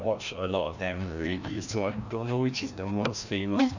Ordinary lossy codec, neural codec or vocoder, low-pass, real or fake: none; codec, 16 kHz, 1 kbps, FreqCodec, larger model; 7.2 kHz; fake